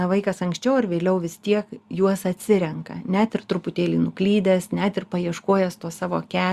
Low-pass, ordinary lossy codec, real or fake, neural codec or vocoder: 14.4 kHz; Opus, 64 kbps; real; none